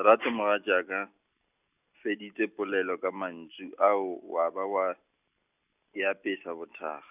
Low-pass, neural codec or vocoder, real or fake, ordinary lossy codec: 3.6 kHz; none; real; none